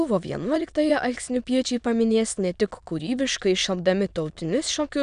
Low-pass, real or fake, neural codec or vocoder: 9.9 kHz; fake; autoencoder, 22.05 kHz, a latent of 192 numbers a frame, VITS, trained on many speakers